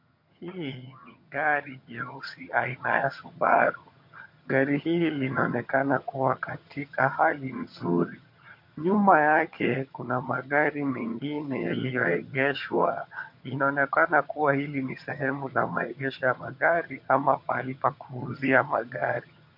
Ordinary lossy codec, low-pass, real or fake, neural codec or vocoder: MP3, 32 kbps; 5.4 kHz; fake; vocoder, 22.05 kHz, 80 mel bands, HiFi-GAN